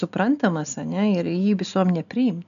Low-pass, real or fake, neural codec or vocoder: 7.2 kHz; real; none